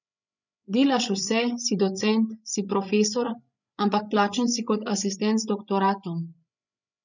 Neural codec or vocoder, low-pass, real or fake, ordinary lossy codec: codec, 16 kHz, 16 kbps, FreqCodec, larger model; 7.2 kHz; fake; none